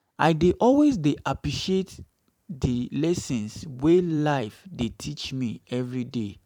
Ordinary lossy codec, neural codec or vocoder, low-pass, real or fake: none; vocoder, 44.1 kHz, 128 mel bands every 512 samples, BigVGAN v2; 19.8 kHz; fake